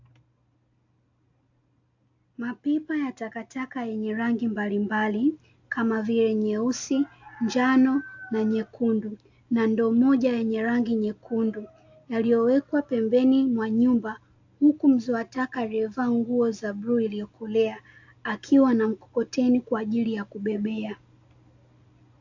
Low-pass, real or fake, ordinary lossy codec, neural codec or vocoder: 7.2 kHz; real; AAC, 48 kbps; none